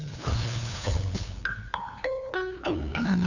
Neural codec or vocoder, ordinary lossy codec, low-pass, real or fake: codec, 16 kHz, 2 kbps, FunCodec, trained on LibriTTS, 25 frames a second; none; 7.2 kHz; fake